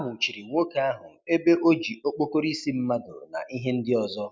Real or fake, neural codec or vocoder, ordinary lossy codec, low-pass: real; none; none; none